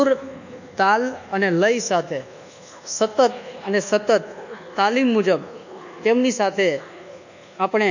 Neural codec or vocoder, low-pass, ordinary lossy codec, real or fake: codec, 24 kHz, 1.2 kbps, DualCodec; 7.2 kHz; none; fake